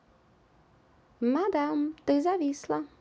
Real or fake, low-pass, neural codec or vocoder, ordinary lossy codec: real; none; none; none